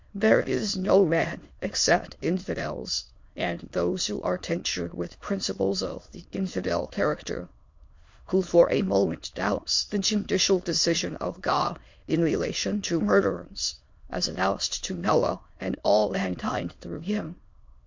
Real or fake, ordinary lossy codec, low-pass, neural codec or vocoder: fake; MP3, 48 kbps; 7.2 kHz; autoencoder, 22.05 kHz, a latent of 192 numbers a frame, VITS, trained on many speakers